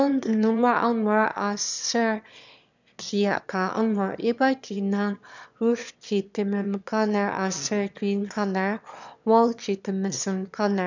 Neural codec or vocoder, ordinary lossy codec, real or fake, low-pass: autoencoder, 22.05 kHz, a latent of 192 numbers a frame, VITS, trained on one speaker; none; fake; 7.2 kHz